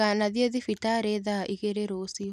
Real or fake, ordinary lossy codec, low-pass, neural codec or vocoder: real; none; 14.4 kHz; none